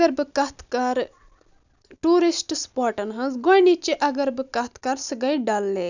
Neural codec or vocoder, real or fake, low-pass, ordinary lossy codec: none; real; 7.2 kHz; none